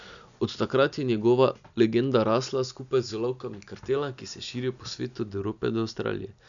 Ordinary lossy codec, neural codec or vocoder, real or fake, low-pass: MP3, 96 kbps; none; real; 7.2 kHz